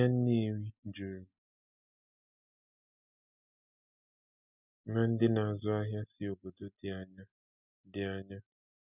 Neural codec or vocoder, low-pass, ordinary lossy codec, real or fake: none; 3.6 kHz; none; real